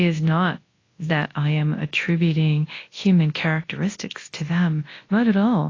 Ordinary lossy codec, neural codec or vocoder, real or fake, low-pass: AAC, 32 kbps; codec, 24 kHz, 0.9 kbps, WavTokenizer, large speech release; fake; 7.2 kHz